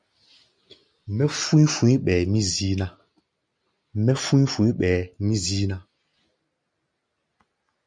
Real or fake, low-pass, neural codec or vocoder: real; 9.9 kHz; none